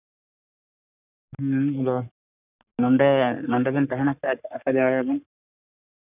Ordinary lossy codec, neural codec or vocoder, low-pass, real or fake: none; codec, 44.1 kHz, 3.4 kbps, Pupu-Codec; 3.6 kHz; fake